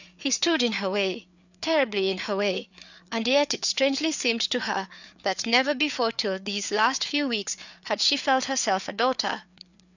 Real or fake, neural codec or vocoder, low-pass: fake; codec, 16 kHz, 4 kbps, FreqCodec, larger model; 7.2 kHz